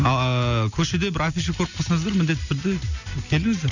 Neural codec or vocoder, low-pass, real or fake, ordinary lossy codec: none; 7.2 kHz; real; none